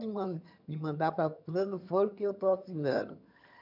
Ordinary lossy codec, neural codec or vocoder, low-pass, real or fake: none; vocoder, 22.05 kHz, 80 mel bands, HiFi-GAN; 5.4 kHz; fake